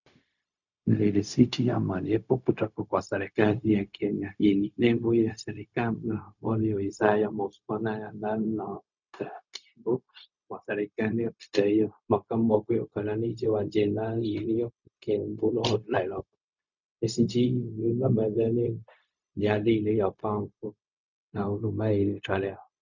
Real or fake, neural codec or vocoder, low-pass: fake; codec, 16 kHz, 0.4 kbps, LongCat-Audio-Codec; 7.2 kHz